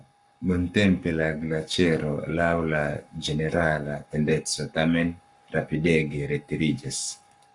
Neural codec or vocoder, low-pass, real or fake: codec, 44.1 kHz, 7.8 kbps, Pupu-Codec; 10.8 kHz; fake